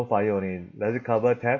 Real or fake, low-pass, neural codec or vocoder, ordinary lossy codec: real; 7.2 kHz; none; MP3, 32 kbps